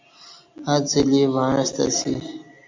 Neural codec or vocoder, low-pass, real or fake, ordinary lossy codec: none; 7.2 kHz; real; MP3, 48 kbps